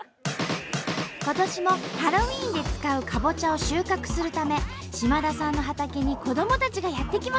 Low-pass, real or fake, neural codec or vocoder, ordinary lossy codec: none; real; none; none